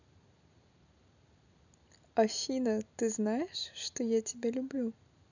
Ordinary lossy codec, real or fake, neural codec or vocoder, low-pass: none; real; none; 7.2 kHz